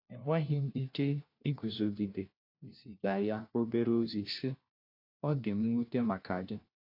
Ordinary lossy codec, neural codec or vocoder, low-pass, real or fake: AAC, 32 kbps; codec, 16 kHz, 1 kbps, FunCodec, trained on LibriTTS, 50 frames a second; 5.4 kHz; fake